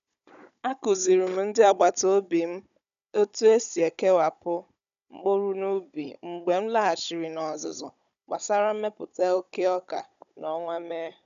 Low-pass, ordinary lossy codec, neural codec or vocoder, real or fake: 7.2 kHz; none; codec, 16 kHz, 16 kbps, FunCodec, trained on Chinese and English, 50 frames a second; fake